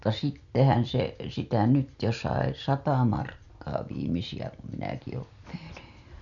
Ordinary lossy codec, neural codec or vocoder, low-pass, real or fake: none; none; 7.2 kHz; real